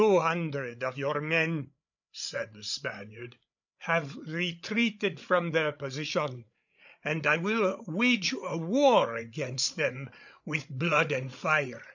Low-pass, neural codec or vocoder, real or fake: 7.2 kHz; codec, 16 kHz, 8 kbps, FreqCodec, larger model; fake